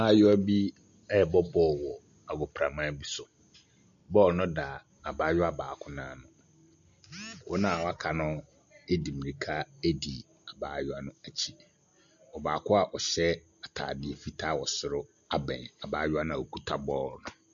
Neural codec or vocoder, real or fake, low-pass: none; real; 7.2 kHz